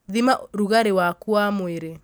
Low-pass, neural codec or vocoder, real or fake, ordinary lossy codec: none; none; real; none